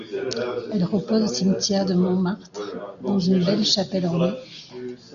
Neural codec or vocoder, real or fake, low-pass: none; real; 7.2 kHz